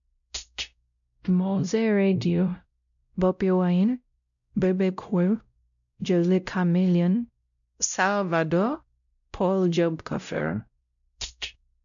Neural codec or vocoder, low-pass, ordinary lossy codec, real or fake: codec, 16 kHz, 0.5 kbps, X-Codec, WavLM features, trained on Multilingual LibriSpeech; 7.2 kHz; none; fake